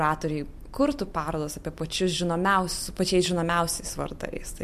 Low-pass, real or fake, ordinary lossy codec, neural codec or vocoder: 14.4 kHz; real; MP3, 64 kbps; none